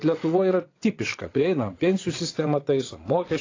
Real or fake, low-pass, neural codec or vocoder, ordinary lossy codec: fake; 7.2 kHz; vocoder, 22.05 kHz, 80 mel bands, WaveNeXt; AAC, 32 kbps